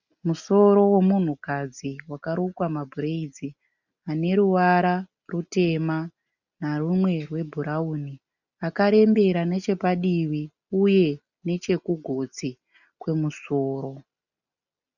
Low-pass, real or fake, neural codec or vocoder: 7.2 kHz; real; none